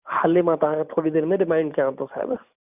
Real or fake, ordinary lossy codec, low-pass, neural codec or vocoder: fake; none; 3.6 kHz; vocoder, 44.1 kHz, 128 mel bands every 512 samples, BigVGAN v2